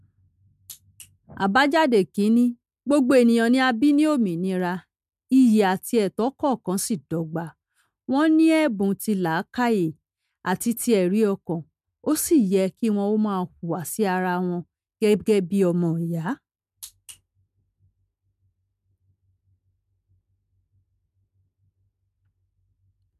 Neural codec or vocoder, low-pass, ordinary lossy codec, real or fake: none; 14.4 kHz; MP3, 96 kbps; real